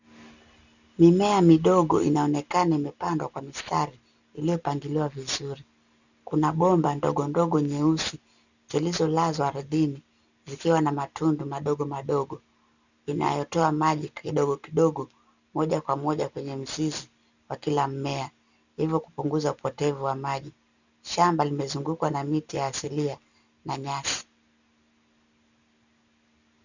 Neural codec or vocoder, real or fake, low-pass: none; real; 7.2 kHz